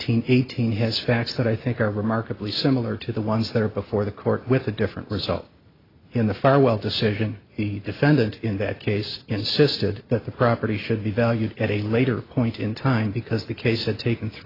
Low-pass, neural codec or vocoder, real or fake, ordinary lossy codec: 5.4 kHz; none; real; AAC, 24 kbps